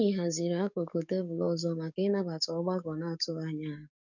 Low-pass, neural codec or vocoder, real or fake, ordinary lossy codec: 7.2 kHz; codec, 44.1 kHz, 7.8 kbps, DAC; fake; none